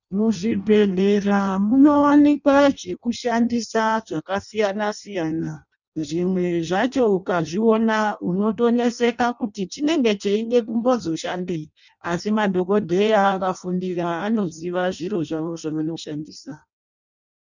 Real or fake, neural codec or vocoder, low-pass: fake; codec, 16 kHz in and 24 kHz out, 0.6 kbps, FireRedTTS-2 codec; 7.2 kHz